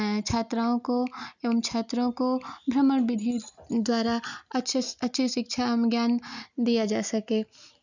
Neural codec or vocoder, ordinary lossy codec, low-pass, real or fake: none; none; 7.2 kHz; real